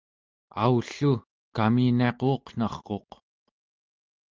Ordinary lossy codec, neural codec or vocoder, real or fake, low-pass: Opus, 32 kbps; none; real; 7.2 kHz